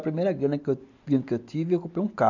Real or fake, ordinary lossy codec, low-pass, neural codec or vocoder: real; none; 7.2 kHz; none